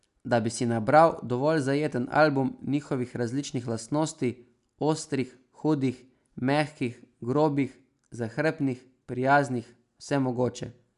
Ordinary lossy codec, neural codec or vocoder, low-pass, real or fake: none; none; 10.8 kHz; real